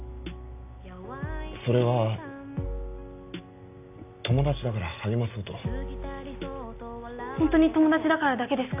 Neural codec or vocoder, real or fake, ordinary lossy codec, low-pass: none; real; none; 3.6 kHz